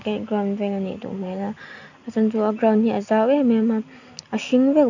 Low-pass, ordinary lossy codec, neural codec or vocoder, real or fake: 7.2 kHz; none; none; real